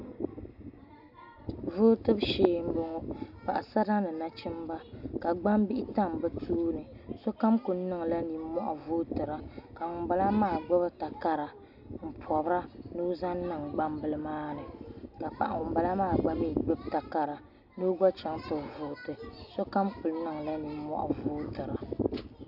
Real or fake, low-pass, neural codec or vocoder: real; 5.4 kHz; none